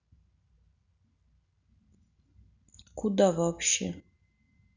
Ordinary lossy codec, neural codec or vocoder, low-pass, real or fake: none; none; 7.2 kHz; real